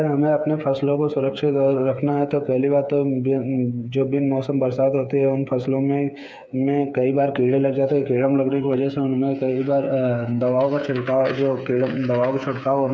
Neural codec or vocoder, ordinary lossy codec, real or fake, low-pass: codec, 16 kHz, 8 kbps, FreqCodec, smaller model; none; fake; none